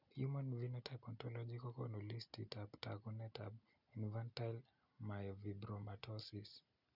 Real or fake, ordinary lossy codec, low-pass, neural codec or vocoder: real; none; 5.4 kHz; none